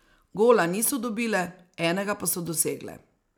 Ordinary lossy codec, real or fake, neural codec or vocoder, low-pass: none; real; none; none